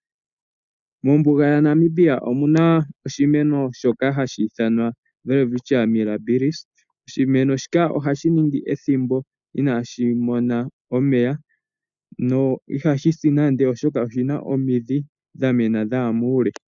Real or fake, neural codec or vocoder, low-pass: real; none; 7.2 kHz